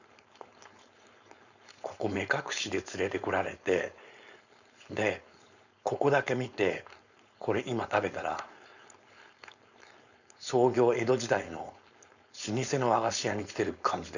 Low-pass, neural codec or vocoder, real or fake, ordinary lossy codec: 7.2 kHz; codec, 16 kHz, 4.8 kbps, FACodec; fake; none